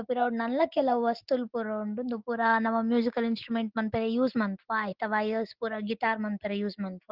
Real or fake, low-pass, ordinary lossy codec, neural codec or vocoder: real; 5.4 kHz; Opus, 16 kbps; none